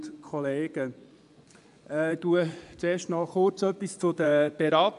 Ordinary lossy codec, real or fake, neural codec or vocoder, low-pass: none; fake; vocoder, 24 kHz, 100 mel bands, Vocos; 10.8 kHz